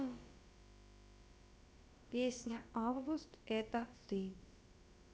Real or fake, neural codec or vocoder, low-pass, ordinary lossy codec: fake; codec, 16 kHz, about 1 kbps, DyCAST, with the encoder's durations; none; none